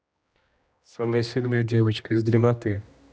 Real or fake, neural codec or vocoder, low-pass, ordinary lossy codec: fake; codec, 16 kHz, 1 kbps, X-Codec, HuBERT features, trained on general audio; none; none